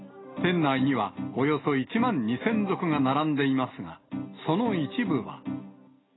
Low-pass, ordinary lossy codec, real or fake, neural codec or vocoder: 7.2 kHz; AAC, 16 kbps; real; none